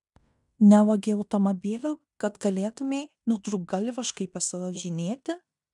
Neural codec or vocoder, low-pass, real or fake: codec, 16 kHz in and 24 kHz out, 0.9 kbps, LongCat-Audio-Codec, fine tuned four codebook decoder; 10.8 kHz; fake